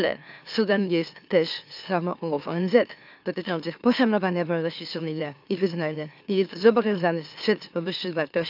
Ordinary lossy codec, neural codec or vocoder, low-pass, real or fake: AAC, 48 kbps; autoencoder, 44.1 kHz, a latent of 192 numbers a frame, MeloTTS; 5.4 kHz; fake